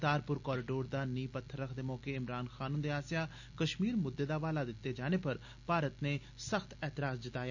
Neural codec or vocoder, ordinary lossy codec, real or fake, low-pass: none; none; real; 7.2 kHz